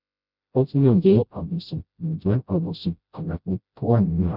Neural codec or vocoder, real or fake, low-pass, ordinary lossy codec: codec, 16 kHz, 0.5 kbps, FreqCodec, smaller model; fake; 5.4 kHz; none